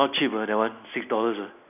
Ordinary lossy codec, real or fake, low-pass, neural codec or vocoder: none; real; 3.6 kHz; none